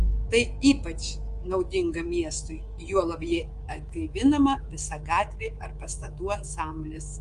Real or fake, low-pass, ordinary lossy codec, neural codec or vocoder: fake; 10.8 kHz; Opus, 24 kbps; codec, 24 kHz, 3.1 kbps, DualCodec